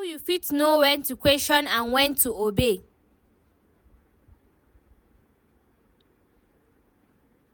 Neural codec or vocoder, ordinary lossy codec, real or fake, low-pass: vocoder, 48 kHz, 128 mel bands, Vocos; none; fake; none